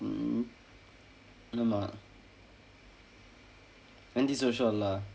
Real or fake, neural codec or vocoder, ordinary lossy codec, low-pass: real; none; none; none